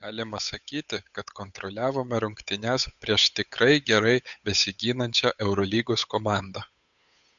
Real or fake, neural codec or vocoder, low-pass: fake; codec, 16 kHz, 8 kbps, FunCodec, trained on Chinese and English, 25 frames a second; 7.2 kHz